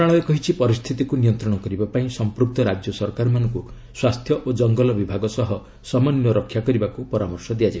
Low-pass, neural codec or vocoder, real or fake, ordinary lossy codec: none; none; real; none